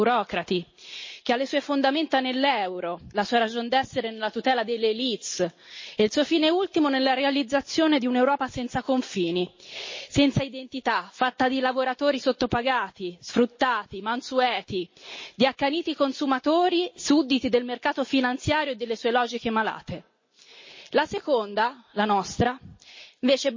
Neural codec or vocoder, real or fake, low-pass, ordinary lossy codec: none; real; 7.2 kHz; MP3, 32 kbps